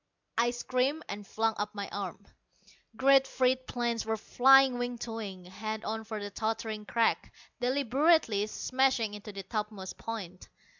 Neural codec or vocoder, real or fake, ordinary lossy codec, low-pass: none; real; MP3, 64 kbps; 7.2 kHz